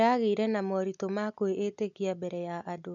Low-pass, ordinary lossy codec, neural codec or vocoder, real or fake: 7.2 kHz; none; none; real